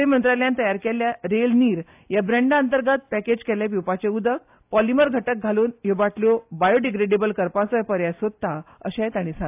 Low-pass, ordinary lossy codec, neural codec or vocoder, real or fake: 3.6 kHz; AAC, 32 kbps; none; real